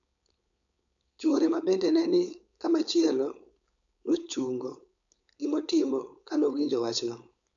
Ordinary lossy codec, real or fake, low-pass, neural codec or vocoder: none; fake; 7.2 kHz; codec, 16 kHz, 4.8 kbps, FACodec